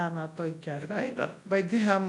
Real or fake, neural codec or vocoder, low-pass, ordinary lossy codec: fake; codec, 24 kHz, 0.9 kbps, WavTokenizer, large speech release; 10.8 kHz; AAC, 48 kbps